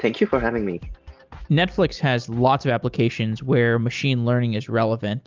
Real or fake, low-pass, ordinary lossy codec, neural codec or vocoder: real; 7.2 kHz; Opus, 32 kbps; none